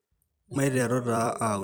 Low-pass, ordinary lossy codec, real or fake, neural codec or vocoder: none; none; fake; vocoder, 44.1 kHz, 128 mel bands every 256 samples, BigVGAN v2